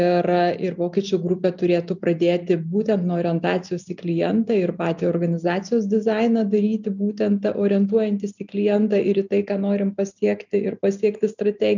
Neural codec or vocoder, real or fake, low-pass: none; real; 7.2 kHz